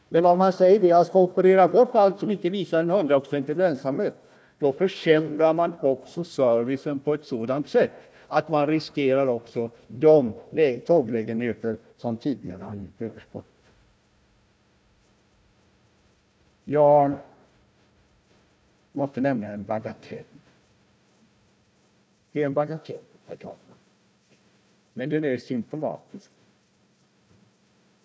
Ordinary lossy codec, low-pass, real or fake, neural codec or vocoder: none; none; fake; codec, 16 kHz, 1 kbps, FunCodec, trained on Chinese and English, 50 frames a second